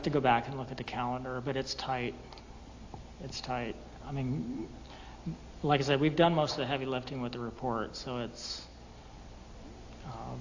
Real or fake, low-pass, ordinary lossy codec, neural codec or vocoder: real; 7.2 kHz; AAC, 48 kbps; none